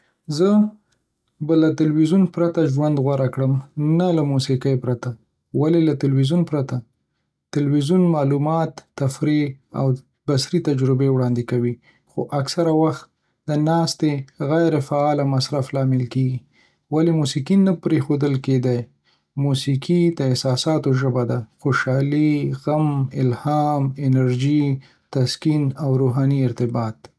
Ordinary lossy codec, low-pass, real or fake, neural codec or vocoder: none; none; real; none